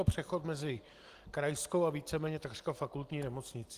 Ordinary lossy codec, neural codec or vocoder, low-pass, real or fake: Opus, 24 kbps; none; 14.4 kHz; real